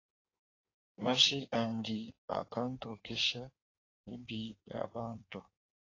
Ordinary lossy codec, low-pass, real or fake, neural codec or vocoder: AAC, 32 kbps; 7.2 kHz; fake; codec, 16 kHz in and 24 kHz out, 1.1 kbps, FireRedTTS-2 codec